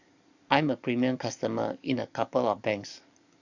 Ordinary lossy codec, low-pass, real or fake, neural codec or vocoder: none; 7.2 kHz; fake; codec, 44.1 kHz, 7.8 kbps, DAC